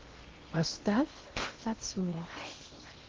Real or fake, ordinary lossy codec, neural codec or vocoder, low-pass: fake; Opus, 16 kbps; codec, 16 kHz in and 24 kHz out, 0.8 kbps, FocalCodec, streaming, 65536 codes; 7.2 kHz